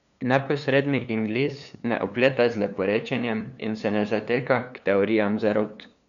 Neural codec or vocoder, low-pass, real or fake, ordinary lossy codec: codec, 16 kHz, 2 kbps, FunCodec, trained on LibriTTS, 25 frames a second; 7.2 kHz; fake; none